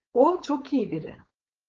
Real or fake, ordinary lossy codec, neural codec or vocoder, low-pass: fake; Opus, 24 kbps; codec, 16 kHz, 4.8 kbps, FACodec; 7.2 kHz